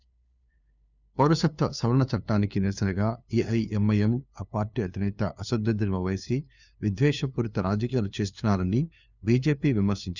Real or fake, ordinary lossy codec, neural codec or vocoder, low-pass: fake; none; codec, 16 kHz, 2 kbps, FunCodec, trained on LibriTTS, 25 frames a second; 7.2 kHz